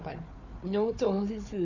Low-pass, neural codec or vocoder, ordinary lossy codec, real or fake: 7.2 kHz; codec, 16 kHz, 4 kbps, FunCodec, trained on Chinese and English, 50 frames a second; none; fake